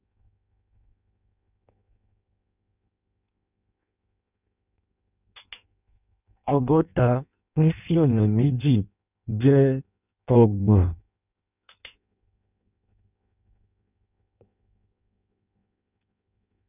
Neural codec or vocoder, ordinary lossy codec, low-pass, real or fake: codec, 16 kHz in and 24 kHz out, 0.6 kbps, FireRedTTS-2 codec; none; 3.6 kHz; fake